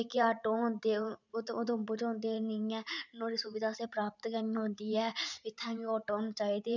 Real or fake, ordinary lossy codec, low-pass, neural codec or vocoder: fake; none; 7.2 kHz; vocoder, 44.1 kHz, 128 mel bands every 512 samples, BigVGAN v2